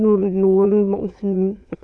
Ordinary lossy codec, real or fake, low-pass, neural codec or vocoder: none; fake; none; autoencoder, 22.05 kHz, a latent of 192 numbers a frame, VITS, trained on many speakers